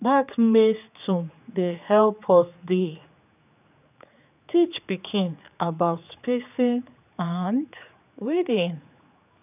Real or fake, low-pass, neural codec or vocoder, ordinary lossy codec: fake; 3.6 kHz; codec, 16 kHz, 4 kbps, X-Codec, HuBERT features, trained on general audio; none